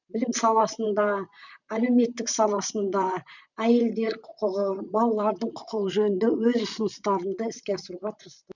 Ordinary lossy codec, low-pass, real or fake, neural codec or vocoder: none; 7.2 kHz; fake; vocoder, 44.1 kHz, 128 mel bands, Pupu-Vocoder